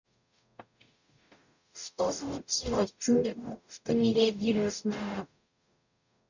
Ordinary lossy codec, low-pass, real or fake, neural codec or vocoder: none; 7.2 kHz; fake; codec, 44.1 kHz, 0.9 kbps, DAC